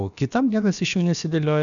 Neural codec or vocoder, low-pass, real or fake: codec, 16 kHz, about 1 kbps, DyCAST, with the encoder's durations; 7.2 kHz; fake